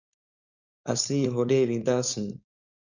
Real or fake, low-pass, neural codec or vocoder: fake; 7.2 kHz; codec, 16 kHz, 4.8 kbps, FACodec